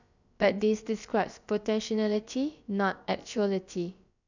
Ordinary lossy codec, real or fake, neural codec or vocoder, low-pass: none; fake; codec, 16 kHz, about 1 kbps, DyCAST, with the encoder's durations; 7.2 kHz